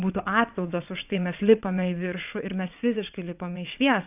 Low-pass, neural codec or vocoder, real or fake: 3.6 kHz; vocoder, 22.05 kHz, 80 mel bands, WaveNeXt; fake